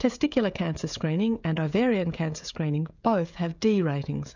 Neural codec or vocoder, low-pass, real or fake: codec, 16 kHz, 16 kbps, FreqCodec, smaller model; 7.2 kHz; fake